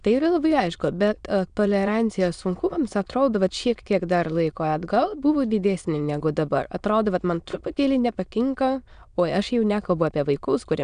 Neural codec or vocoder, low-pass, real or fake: autoencoder, 22.05 kHz, a latent of 192 numbers a frame, VITS, trained on many speakers; 9.9 kHz; fake